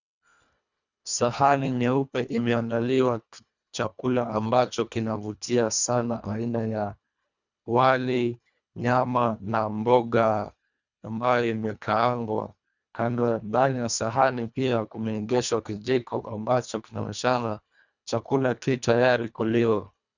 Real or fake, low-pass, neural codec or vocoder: fake; 7.2 kHz; codec, 24 kHz, 1.5 kbps, HILCodec